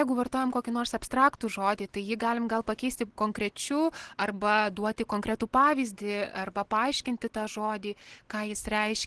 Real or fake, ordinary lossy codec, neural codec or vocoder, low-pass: real; Opus, 16 kbps; none; 10.8 kHz